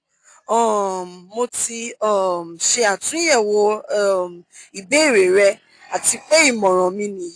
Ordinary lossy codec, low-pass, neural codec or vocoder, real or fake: AAC, 48 kbps; 9.9 kHz; none; real